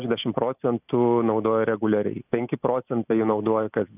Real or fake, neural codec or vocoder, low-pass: real; none; 3.6 kHz